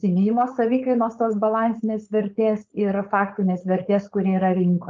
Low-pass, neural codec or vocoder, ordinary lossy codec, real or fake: 7.2 kHz; codec, 16 kHz, 4.8 kbps, FACodec; Opus, 32 kbps; fake